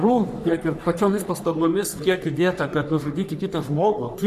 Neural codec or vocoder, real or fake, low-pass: codec, 44.1 kHz, 3.4 kbps, Pupu-Codec; fake; 14.4 kHz